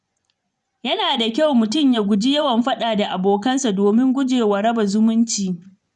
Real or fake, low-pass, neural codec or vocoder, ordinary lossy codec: fake; 10.8 kHz; vocoder, 24 kHz, 100 mel bands, Vocos; none